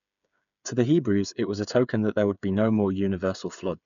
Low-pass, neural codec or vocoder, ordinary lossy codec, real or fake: 7.2 kHz; codec, 16 kHz, 8 kbps, FreqCodec, smaller model; none; fake